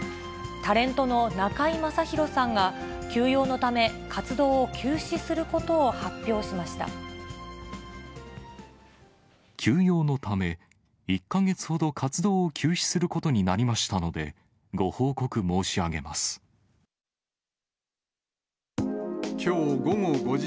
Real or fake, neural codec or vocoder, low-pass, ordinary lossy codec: real; none; none; none